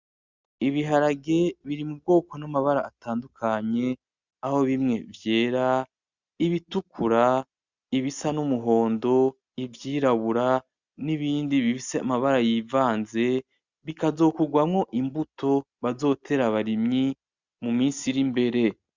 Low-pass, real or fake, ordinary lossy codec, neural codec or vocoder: 7.2 kHz; real; Opus, 64 kbps; none